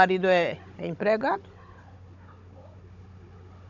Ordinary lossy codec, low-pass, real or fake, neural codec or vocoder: none; 7.2 kHz; fake; codec, 16 kHz, 16 kbps, FunCodec, trained on Chinese and English, 50 frames a second